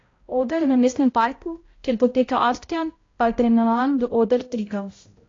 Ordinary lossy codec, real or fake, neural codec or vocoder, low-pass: AAC, 32 kbps; fake; codec, 16 kHz, 0.5 kbps, X-Codec, HuBERT features, trained on balanced general audio; 7.2 kHz